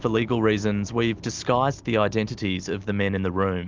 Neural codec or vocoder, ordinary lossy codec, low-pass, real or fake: none; Opus, 24 kbps; 7.2 kHz; real